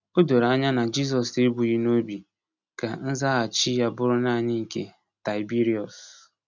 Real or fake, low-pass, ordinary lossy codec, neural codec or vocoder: real; 7.2 kHz; none; none